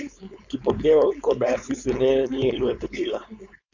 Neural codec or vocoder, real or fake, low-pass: codec, 16 kHz, 4.8 kbps, FACodec; fake; 7.2 kHz